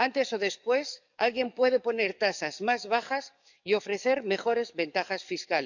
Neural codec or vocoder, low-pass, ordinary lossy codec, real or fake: codec, 44.1 kHz, 7.8 kbps, DAC; 7.2 kHz; none; fake